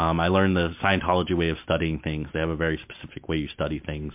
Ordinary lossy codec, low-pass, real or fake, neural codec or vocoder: MP3, 32 kbps; 3.6 kHz; real; none